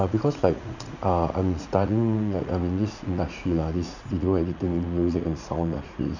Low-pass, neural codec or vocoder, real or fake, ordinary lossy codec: 7.2 kHz; vocoder, 44.1 kHz, 80 mel bands, Vocos; fake; none